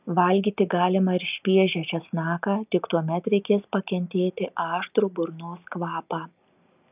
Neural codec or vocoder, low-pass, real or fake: none; 3.6 kHz; real